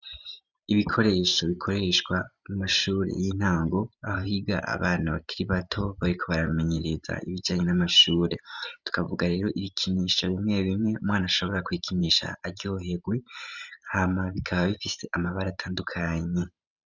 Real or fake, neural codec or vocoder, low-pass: fake; vocoder, 44.1 kHz, 128 mel bands every 512 samples, BigVGAN v2; 7.2 kHz